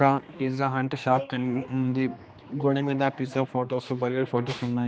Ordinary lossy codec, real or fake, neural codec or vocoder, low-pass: none; fake; codec, 16 kHz, 2 kbps, X-Codec, HuBERT features, trained on general audio; none